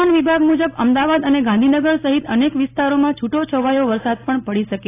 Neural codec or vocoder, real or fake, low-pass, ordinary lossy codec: none; real; 3.6 kHz; AAC, 16 kbps